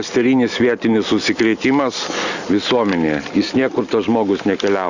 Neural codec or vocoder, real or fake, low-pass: none; real; 7.2 kHz